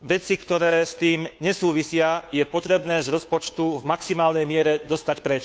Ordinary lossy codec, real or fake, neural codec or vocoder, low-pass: none; fake; codec, 16 kHz, 2 kbps, FunCodec, trained on Chinese and English, 25 frames a second; none